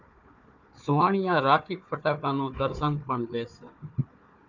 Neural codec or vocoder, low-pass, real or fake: codec, 16 kHz, 4 kbps, FunCodec, trained on Chinese and English, 50 frames a second; 7.2 kHz; fake